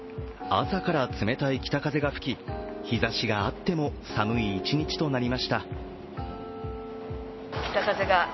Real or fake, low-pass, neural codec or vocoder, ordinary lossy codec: real; 7.2 kHz; none; MP3, 24 kbps